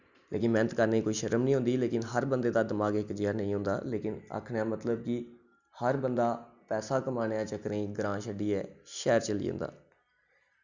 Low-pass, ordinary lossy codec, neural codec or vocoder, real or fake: 7.2 kHz; none; none; real